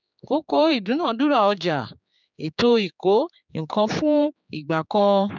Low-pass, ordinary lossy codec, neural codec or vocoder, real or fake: 7.2 kHz; none; codec, 16 kHz, 4 kbps, X-Codec, HuBERT features, trained on general audio; fake